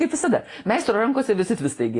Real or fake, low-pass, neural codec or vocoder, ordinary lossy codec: real; 10.8 kHz; none; AAC, 32 kbps